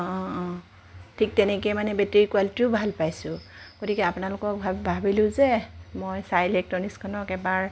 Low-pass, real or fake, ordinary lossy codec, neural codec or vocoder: none; real; none; none